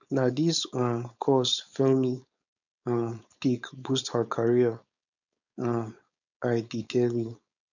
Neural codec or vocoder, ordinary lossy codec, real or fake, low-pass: codec, 16 kHz, 4.8 kbps, FACodec; none; fake; 7.2 kHz